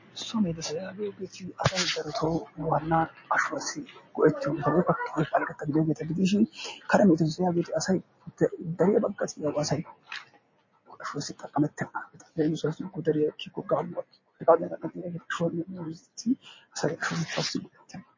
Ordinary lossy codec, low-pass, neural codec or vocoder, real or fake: MP3, 32 kbps; 7.2 kHz; none; real